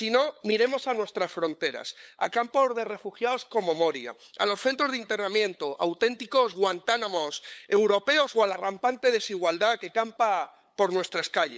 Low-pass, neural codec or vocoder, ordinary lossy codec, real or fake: none; codec, 16 kHz, 8 kbps, FunCodec, trained on LibriTTS, 25 frames a second; none; fake